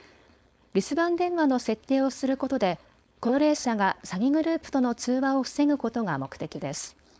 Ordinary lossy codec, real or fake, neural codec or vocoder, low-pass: none; fake; codec, 16 kHz, 4.8 kbps, FACodec; none